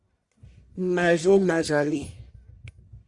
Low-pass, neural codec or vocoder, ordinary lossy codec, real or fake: 10.8 kHz; codec, 44.1 kHz, 1.7 kbps, Pupu-Codec; Opus, 64 kbps; fake